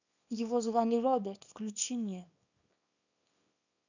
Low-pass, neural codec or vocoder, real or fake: 7.2 kHz; codec, 24 kHz, 0.9 kbps, WavTokenizer, small release; fake